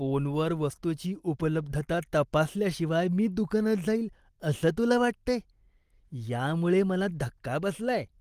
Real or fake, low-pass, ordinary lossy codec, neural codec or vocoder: real; 14.4 kHz; Opus, 24 kbps; none